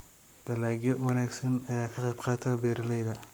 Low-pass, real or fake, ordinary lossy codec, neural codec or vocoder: none; fake; none; codec, 44.1 kHz, 7.8 kbps, Pupu-Codec